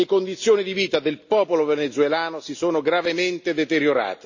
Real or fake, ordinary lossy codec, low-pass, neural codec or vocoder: real; none; 7.2 kHz; none